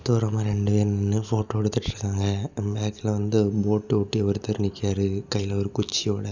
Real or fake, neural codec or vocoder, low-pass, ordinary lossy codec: real; none; 7.2 kHz; none